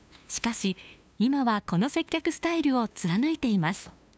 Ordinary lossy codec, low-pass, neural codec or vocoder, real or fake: none; none; codec, 16 kHz, 2 kbps, FunCodec, trained on LibriTTS, 25 frames a second; fake